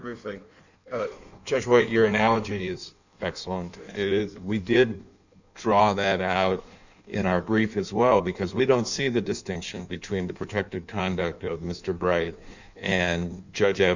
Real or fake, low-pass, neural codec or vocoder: fake; 7.2 kHz; codec, 16 kHz in and 24 kHz out, 1.1 kbps, FireRedTTS-2 codec